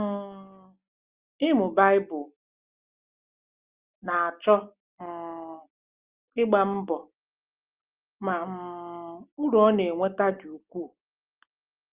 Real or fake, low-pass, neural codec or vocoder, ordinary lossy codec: real; 3.6 kHz; none; Opus, 32 kbps